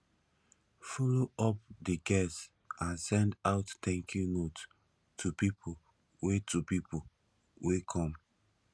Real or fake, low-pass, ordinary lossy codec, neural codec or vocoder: real; none; none; none